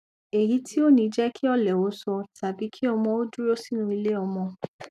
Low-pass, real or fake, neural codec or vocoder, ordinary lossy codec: 14.4 kHz; real; none; none